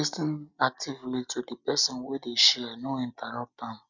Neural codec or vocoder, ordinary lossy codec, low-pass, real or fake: none; none; 7.2 kHz; real